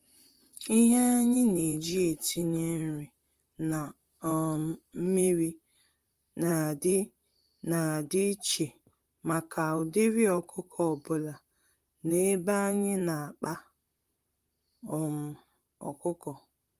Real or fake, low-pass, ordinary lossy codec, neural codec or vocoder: fake; 14.4 kHz; Opus, 32 kbps; vocoder, 44.1 kHz, 128 mel bands, Pupu-Vocoder